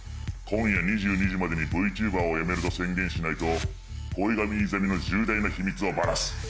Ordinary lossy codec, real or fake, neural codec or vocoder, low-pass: none; real; none; none